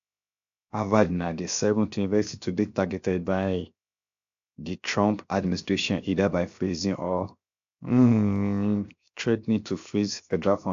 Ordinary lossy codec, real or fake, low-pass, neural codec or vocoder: AAC, 64 kbps; fake; 7.2 kHz; codec, 16 kHz, 0.7 kbps, FocalCodec